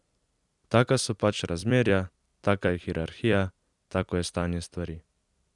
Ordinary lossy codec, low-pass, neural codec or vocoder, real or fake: none; 10.8 kHz; vocoder, 44.1 kHz, 128 mel bands every 256 samples, BigVGAN v2; fake